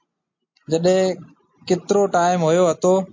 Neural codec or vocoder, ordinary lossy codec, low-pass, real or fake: none; MP3, 48 kbps; 7.2 kHz; real